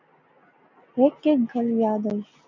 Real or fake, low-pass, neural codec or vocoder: real; 7.2 kHz; none